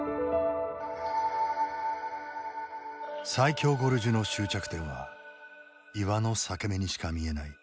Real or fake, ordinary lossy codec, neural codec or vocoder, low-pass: real; none; none; none